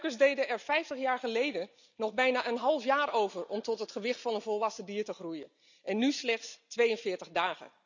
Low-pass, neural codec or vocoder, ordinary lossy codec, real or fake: 7.2 kHz; none; none; real